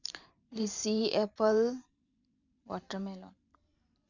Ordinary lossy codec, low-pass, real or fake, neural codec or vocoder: none; 7.2 kHz; fake; vocoder, 44.1 kHz, 128 mel bands every 512 samples, BigVGAN v2